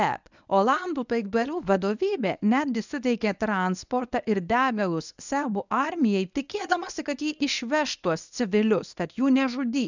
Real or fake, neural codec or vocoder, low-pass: fake; codec, 24 kHz, 0.9 kbps, WavTokenizer, medium speech release version 1; 7.2 kHz